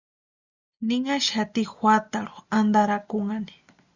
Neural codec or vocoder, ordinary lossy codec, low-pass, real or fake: none; Opus, 64 kbps; 7.2 kHz; real